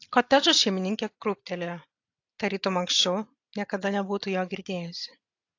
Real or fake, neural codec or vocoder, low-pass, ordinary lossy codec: real; none; 7.2 kHz; AAC, 48 kbps